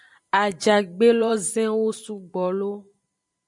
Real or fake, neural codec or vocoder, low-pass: fake; vocoder, 44.1 kHz, 128 mel bands every 512 samples, BigVGAN v2; 10.8 kHz